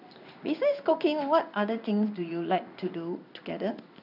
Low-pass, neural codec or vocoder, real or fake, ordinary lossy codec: 5.4 kHz; codec, 16 kHz in and 24 kHz out, 1 kbps, XY-Tokenizer; fake; none